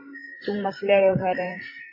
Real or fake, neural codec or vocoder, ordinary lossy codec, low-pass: fake; codec, 16 kHz, 16 kbps, FreqCodec, larger model; MP3, 24 kbps; 5.4 kHz